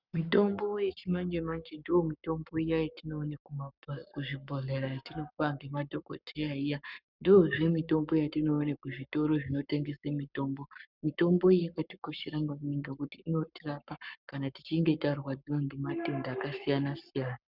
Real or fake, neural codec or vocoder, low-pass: fake; codec, 44.1 kHz, 7.8 kbps, Pupu-Codec; 5.4 kHz